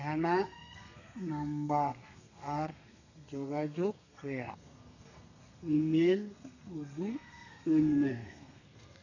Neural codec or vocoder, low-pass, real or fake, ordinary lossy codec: codec, 32 kHz, 1.9 kbps, SNAC; 7.2 kHz; fake; none